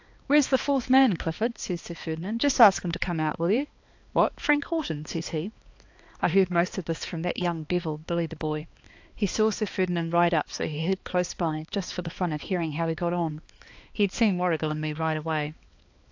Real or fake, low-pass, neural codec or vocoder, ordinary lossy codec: fake; 7.2 kHz; codec, 16 kHz, 2 kbps, X-Codec, HuBERT features, trained on balanced general audio; AAC, 48 kbps